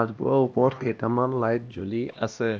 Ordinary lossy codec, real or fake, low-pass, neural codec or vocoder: none; fake; none; codec, 16 kHz, 1 kbps, X-Codec, HuBERT features, trained on LibriSpeech